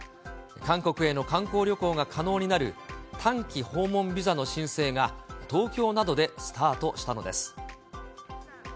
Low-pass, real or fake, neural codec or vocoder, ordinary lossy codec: none; real; none; none